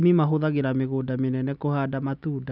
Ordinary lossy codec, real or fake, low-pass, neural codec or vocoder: none; real; 5.4 kHz; none